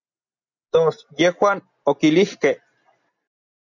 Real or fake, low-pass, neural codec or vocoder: real; 7.2 kHz; none